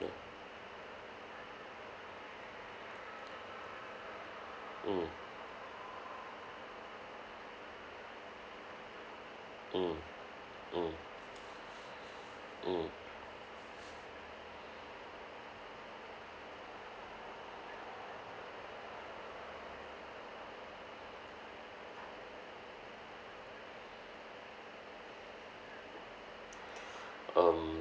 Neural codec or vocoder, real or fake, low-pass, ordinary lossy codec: none; real; none; none